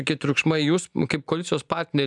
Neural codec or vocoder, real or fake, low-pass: none; real; 10.8 kHz